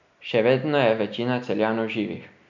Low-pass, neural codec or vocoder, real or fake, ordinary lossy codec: 7.2 kHz; none; real; none